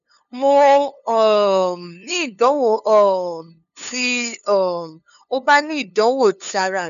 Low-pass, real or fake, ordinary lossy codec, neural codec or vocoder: 7.2 kHz; fake; none; codec, 16 kHz, 2 kbps, FunCodec, trained on LibriTTS, 25 frames a second